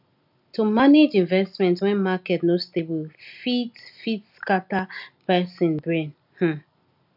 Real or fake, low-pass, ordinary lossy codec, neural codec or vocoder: real; 5.4 kHz; none; none